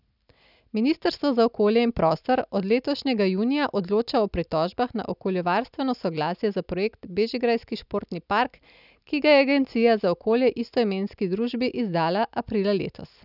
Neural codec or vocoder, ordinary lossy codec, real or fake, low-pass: none; none; real; 5.4 kHz